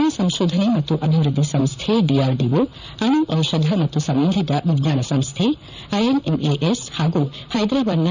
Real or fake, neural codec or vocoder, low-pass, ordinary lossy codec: fake; vocoder, 44.1 kHz, 128 mel bands, Pupu-Vocoder; 7.2 kHz; none